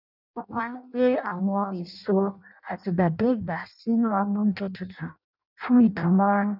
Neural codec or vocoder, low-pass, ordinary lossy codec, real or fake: codec, 16 kHz in and 24 kHz out, 0.6 kbps, FireRedTTS-2 codec; 5.4 kHz; none; fake